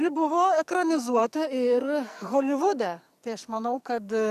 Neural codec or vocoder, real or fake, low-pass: codec, 44.1 kHz, 2.6 kbps, SNAC; fake; 14.4 kHz